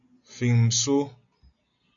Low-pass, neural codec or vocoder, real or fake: 7.2 kHz; none; real